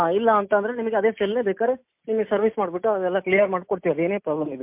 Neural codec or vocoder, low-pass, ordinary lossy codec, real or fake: none; 3.6 kHz; MP3, 32 kbps; real